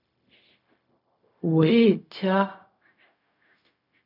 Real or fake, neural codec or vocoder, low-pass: fake; codec, 16 kHz, 0.4 kbps, LongCat-Audio-Codec; 5.4 kHz